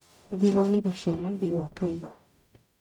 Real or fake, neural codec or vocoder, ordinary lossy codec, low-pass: fake; codec, 44.1 kHz, 0.9 kbps, DAC; none; 19.8 kHz